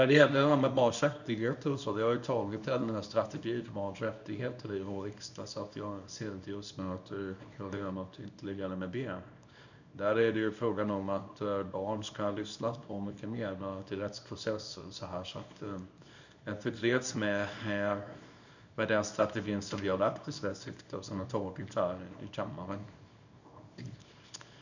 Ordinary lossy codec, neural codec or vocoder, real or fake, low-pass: none; codec, 24 kHz, 0.9 kbps, WavTokenizer, small release; fake; 7.2 kHz